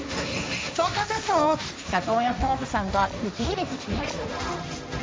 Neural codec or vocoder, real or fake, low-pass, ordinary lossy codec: codec, 16 kHz, 1.1 kbps, Voila-Tokenizer; fake; none; none